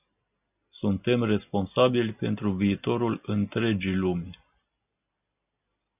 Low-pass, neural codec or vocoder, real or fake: 3.6 kHz; none; real